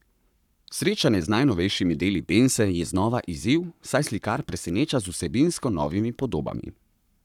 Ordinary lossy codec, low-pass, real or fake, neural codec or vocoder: none; 19.8 kHz; fake; codec, 44.1 kHz, 7.8 kbps, Pupu-Codec